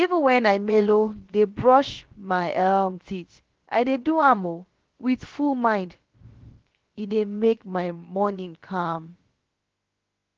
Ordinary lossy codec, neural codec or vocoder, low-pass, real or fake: Opus, 16 kbps; codec, 16 kHz, about 1 kbps, DyCAST, with the encoder's durations; 7.2 kHz; fake